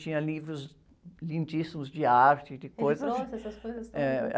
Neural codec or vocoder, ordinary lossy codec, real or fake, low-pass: none; none; real; none